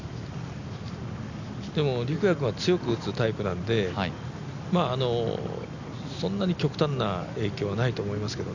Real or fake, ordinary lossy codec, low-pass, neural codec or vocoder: real; none; 7.2 kHz; none